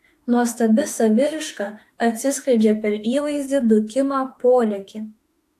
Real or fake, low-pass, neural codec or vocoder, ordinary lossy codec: fake; 14.4 kHz; autoencoder, 48 kHz, 32 numbers a frame, DAC-VAE, trained on Japanese speech; AAC, 64 kbps